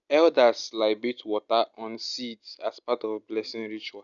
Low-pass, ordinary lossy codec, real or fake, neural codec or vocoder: 7.2 kHz; none; real; none